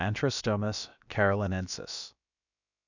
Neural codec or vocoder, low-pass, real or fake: codec, 16 kHz, about 1 kbps, DyCAST, with the encoder's durations; 7.2 kHz; fake